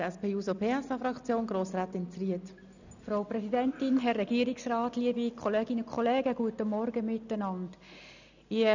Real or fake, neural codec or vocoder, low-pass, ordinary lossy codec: real; none; 7.2 kHz; none